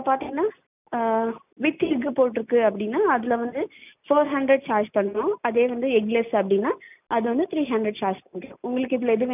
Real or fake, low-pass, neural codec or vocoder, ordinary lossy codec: real; 3.6 kHz; none; none